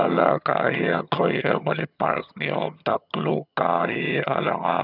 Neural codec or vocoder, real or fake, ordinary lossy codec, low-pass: vocoder, 22.05 kHz, 80 mel bands, HiFi-GAN; fake; none; 5.4 kHz